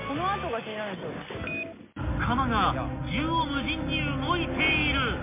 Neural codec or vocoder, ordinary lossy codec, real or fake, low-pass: none; MP3, 24 kbps; real; 3.6 kHz